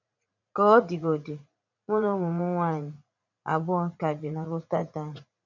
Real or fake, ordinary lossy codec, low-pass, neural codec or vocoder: fake; none; 7.2 kHz; vocoder, 44.1 kHz, 80 mel bands, Vocos